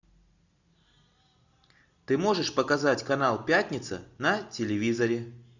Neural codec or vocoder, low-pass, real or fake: none; 7.2 kHz; real